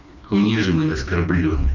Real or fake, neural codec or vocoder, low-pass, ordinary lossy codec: fake; codec, 16 kHz, 2 kbps, FreqCodec, smaller model; 7.2 kHz; none